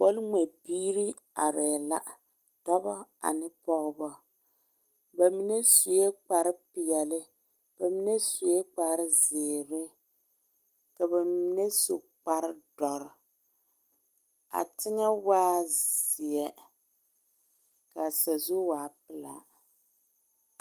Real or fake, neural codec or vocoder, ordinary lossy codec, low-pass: real; none; Opus, 24 kbps; 14.4 kHz